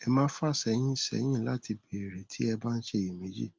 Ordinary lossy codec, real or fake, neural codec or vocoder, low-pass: Opus, 32 kbps; real; none; 7.2 kHz